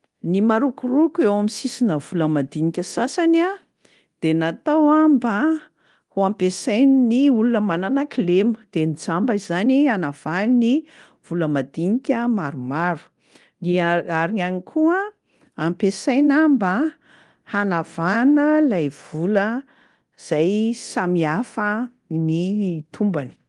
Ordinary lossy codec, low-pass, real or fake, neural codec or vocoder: Opus, 24 kbps; 10.8 kHz; fake; codec, 24 kHz, 0.9 kbps, DualCodec